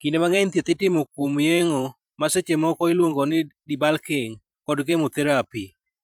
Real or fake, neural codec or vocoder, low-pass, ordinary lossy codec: fake; vocoder, 44.1 kHz, 128 mel bands every 512 samples, BigVGAN v2; 19.8 kHz; none